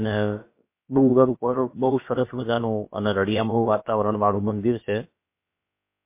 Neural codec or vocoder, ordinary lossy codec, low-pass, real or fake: codec, 16 kHz, about 1 kbps, DyCAST, with the encoder's durations; MP3, 24 kbps; 3.6 kHz; fake